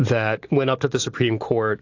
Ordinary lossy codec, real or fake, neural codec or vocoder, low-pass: AAC, 48 kbps; real; none; 7.2 kHz